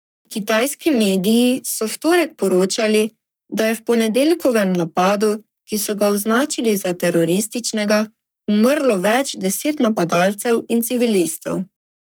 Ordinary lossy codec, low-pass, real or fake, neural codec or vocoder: none; none; fake; codec, 44.1 kHz, 3.4 kbps, Pupu-Codec